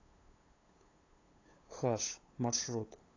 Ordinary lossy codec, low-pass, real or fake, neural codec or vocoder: AAC, 32 kbps; 7.2 kHz; fake; codec, 16 kHz, 8 kbps, FunCodec, trained on LibriTTS, 25 frames a second